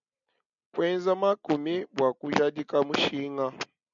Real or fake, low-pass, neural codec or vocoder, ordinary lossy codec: real; 7.2 kHz; none; MP3, 96 kbps